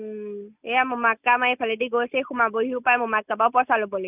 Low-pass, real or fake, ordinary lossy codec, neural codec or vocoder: 3.6 kHz; real; none; none